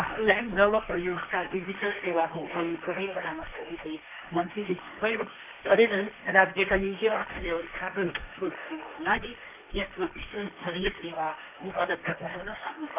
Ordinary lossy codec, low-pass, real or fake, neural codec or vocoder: none; 3.6 kHz; fake; codec, 16 kHz, 1.1 kbps, Voila-Tokenizer